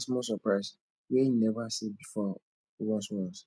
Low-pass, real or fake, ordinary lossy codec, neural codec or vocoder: none; real; none; none